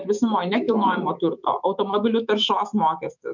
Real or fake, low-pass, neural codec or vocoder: fake; 7.2 kHz; autoencoder, 48 kHz, 128 numbers a frame, DAC-VAE, trained on Japanese speech